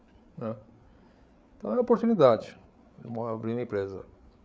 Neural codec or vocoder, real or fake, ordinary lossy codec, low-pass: codec, 16 kHz, 8 kbps, FreqCodec, larger model; fake; none; none